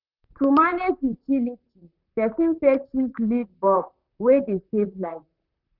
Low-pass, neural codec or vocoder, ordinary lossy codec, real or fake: 5.4 kHz; vocoder, 44.1 kHz, 128 mel bands, Pupu-Vocoder; none; fake